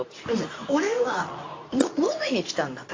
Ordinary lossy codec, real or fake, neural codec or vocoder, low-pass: AAC, 32 kbps; fake; codec, 24 kHz, 0.9 kbps, WavTokenizer, medium speech release version 2; 7.2 kHz